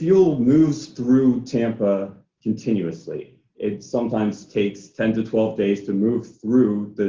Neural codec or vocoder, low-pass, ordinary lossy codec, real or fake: none; 7.2 kHz; Opus, 32 kbps; real